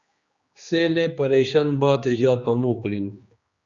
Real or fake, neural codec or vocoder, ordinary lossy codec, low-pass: fake; codec, 16 kHz, 2 kbps, X-Codec, HuBERT features, trained on general audio; Opus, 64 kbps; 7.2 kHz